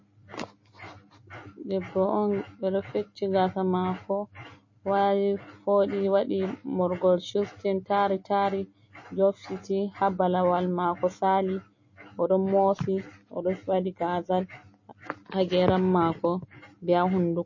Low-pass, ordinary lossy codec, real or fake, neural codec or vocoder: 7.2 kHz; MP3, 32 kbps; real; none